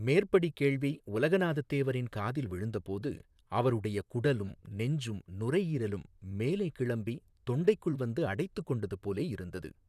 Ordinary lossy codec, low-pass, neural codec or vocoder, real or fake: none; 14.4 kHz; vocoder, 44.1 kHz, 128 mel bands, Pupu-Vocoder; fake